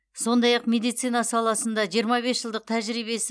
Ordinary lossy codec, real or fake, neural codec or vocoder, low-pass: none; real; none; none